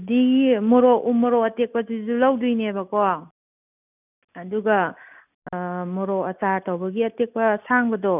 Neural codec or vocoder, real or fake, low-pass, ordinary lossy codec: none; real; 3.6 kHz; none